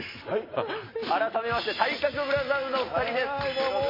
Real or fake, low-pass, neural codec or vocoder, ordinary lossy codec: real; 5.4 kHz; none; MP3, 24 kbps